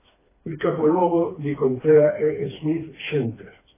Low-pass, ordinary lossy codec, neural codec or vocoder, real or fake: 3.6 kHz; AAC, 16 kbps; codec, 16 kHz, 2 kbps, FreqCodec, smaller model; fake